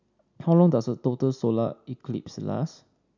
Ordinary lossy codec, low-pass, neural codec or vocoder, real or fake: none; 7.2 kHz; none; real